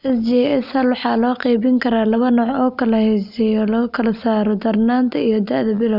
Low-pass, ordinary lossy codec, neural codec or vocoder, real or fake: 5.4 kHz; none; none; real